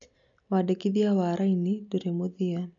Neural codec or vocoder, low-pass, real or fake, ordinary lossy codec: none; 7.2 kHz; real; AAC, 64 kbps